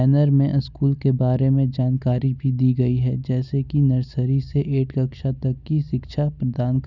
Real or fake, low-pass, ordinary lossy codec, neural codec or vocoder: real; 7.2 kHz; none; none